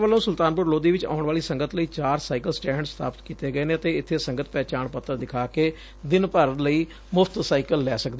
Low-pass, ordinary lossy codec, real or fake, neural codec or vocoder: none; none; real; none